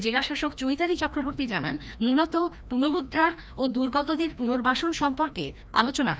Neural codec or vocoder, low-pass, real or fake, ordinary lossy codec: codec, 16 kHz, 1 kbps, FreqCodec, larger model; none; fake; none